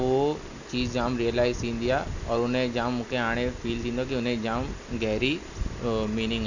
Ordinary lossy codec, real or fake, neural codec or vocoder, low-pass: none; real; none; 7.2 kHz